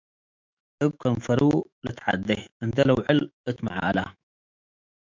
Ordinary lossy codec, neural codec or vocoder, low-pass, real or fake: MP3, 64 kbps; autoencoder, 48 kHz, 128 numbers a frame, DAC-VAE, trained on Japanese speech; 7.2 kHz; fake